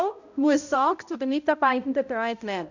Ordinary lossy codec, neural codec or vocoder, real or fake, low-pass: none; codec, 16 kHz, 0.5 kbps, X-Codec, HuBERT features, trained on balanced general audio; fake; 7.2 kHz